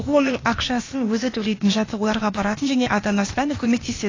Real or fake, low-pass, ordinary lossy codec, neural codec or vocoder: fake; 7.2 kHz; AAC, 32 kbps; codec, 16 kHz, 0.8 kbps, ZipCodec